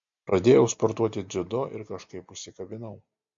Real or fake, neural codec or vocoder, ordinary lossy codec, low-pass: real; none; MP3, 48 kbps; 7.2 kHz